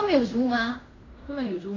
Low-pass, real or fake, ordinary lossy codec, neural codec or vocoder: 7.2 kHz; fake; AAC, 32 kbps; codec, 16 kHz in and 24 kHz out, 0.9 kbps, LongCat-Audio-Codec, fine tuned four codebook decoder